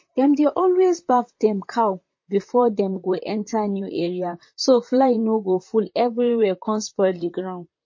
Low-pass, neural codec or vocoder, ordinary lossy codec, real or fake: 7.2 kHz; vocoder, 44.1 kHz, 128 mel bands, Pupu-Vocoder; MP3, 32 kbps; fake